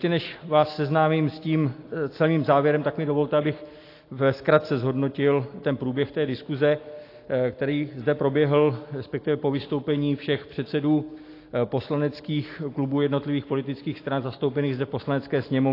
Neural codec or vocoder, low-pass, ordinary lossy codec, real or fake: none; 5.4 kHz; AAC, 32 kbps; real